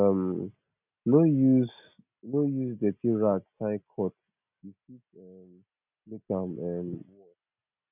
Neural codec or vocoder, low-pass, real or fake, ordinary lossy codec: none; 3.6 kHz; real; none